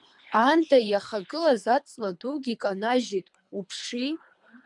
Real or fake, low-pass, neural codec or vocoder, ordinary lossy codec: fake; 10.8 kHz; codec, 24 kHz, 3 kbps, HILCodec; MP3, 96 kbps